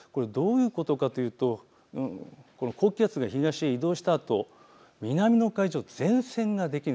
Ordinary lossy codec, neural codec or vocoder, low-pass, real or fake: none; none; none; real